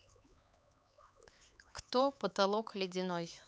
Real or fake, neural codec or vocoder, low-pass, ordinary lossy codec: fake; codec, 16 kHz, 4 kbps, X-Codec, HuBERT features, trained on LibriSpeech; none; none